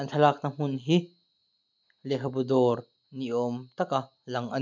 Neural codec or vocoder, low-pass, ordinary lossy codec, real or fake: none; 7.2 kHz; none; real